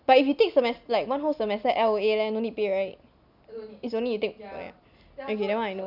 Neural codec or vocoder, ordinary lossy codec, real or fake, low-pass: none; none; real; 5.4 kHz